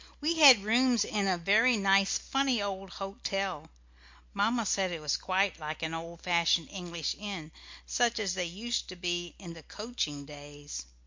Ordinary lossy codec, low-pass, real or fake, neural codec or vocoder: MP3, 48 kbps; 7.2 kHz; real; none